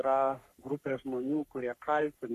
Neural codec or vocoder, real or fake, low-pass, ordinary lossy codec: codec, 44.1 kHz, 3.4 kbps, Pupu-Codec; fake; 14.4 kHz; AAC, 64 kbps